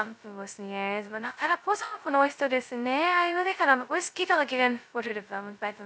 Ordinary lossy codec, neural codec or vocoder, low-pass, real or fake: none; codec, 16 kHz, 0.2 kbps, FocalCodec; none; fake